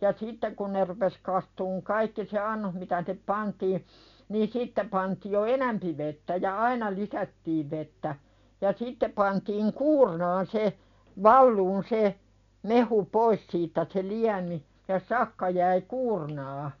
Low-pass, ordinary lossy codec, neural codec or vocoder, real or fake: 7.2 kHz; none; none; real